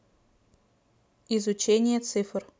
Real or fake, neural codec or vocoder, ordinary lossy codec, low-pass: real; none; none; none